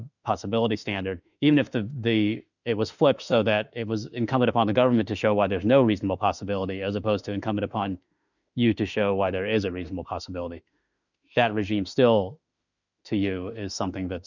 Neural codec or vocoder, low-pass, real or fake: autoencoder, 48 kHz, 32 numbers a frame, DAC-VAE, trained on Japanese speech; 7.2 kHz; fake